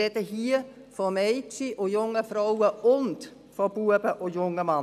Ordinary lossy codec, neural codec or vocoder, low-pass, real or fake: none; none; 14.4 kHz; real